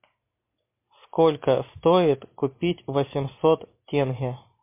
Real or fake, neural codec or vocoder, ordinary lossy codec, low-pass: real; none; MP3, 24 kbps; 3.6 kHz